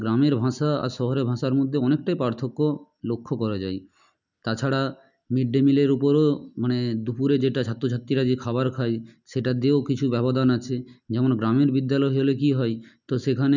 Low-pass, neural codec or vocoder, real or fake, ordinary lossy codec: 7.2 kHz; none; real; none